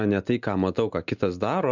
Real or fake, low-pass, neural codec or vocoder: real; 7.2 kHz; none